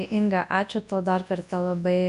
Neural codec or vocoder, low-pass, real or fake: codec, 24 kHz, 0.9 kbps, WavTokenizer, large speech release; 10.8 kHz; fake